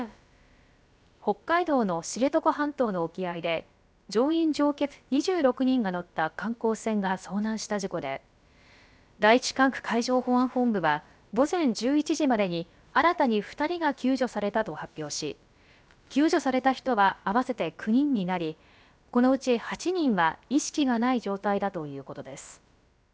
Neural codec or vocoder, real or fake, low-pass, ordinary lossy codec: codec, 16 kHz, about 1 kbps, DyCAST, with the encoder's durations; fake; none; none